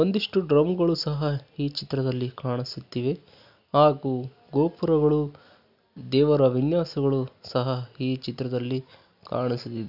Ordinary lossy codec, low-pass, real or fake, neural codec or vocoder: none; 5.4 kHz; real; none